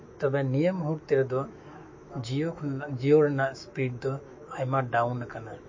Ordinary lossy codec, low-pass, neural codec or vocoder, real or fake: MP3, 32 kbps; 7.2 kHz; autoencoder, 48 kHz, 128 numbers a frame, DAC-VAE, trained on Japanese speech; fake